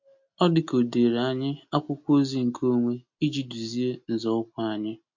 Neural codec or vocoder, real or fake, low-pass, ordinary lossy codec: none; real; 7.2 kHz; MP3, 48 kbps